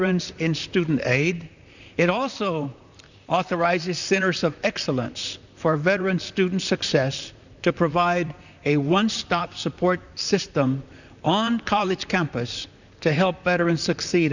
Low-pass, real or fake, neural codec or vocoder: 7.2 kHz; fake; vocoder, 44.1 kHz, 128 mel bands, Pupu-Vocoder